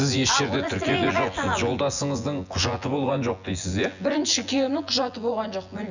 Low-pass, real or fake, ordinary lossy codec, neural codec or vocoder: 7.2 kHz; fake; none; vocoder, 24 kHz, 100 mel bands, Vocos